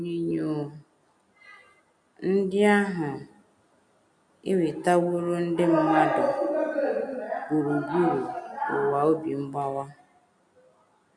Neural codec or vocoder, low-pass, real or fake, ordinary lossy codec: none; 9.9 kHz; real; none